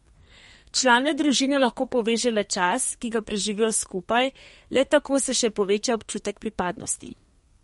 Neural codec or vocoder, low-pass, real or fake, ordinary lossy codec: codec, 32 kHz, 1.9 kbps, SNAC; 14.4 kHz; fake; MP3, 48 kbps